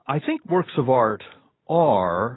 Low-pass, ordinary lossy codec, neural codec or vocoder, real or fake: 7.2 kHz; AAC, 16 kbps; none; real